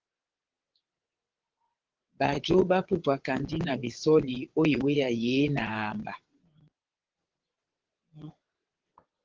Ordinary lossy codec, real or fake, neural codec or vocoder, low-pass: Opus, 16 kbps; fake; vocoder, 22.05 kHz, 80 mel bands, WaveNeXt; 7.2 kHz